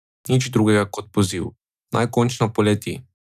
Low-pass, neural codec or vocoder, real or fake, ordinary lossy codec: 14.4 kHz; none; real; none